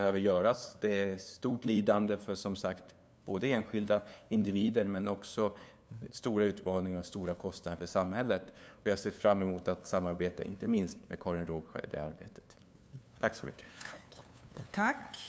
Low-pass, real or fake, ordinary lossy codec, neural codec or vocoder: none; fake; none; codec, 16 kHz, 2 kbps, FunCodec, trained on LibriTTS, 25 frames a second